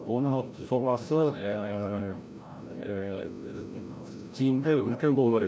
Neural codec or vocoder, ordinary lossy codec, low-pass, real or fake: codec, 16 kHz, 0.5 kbps, FreqCodec, larger model; none; none; fake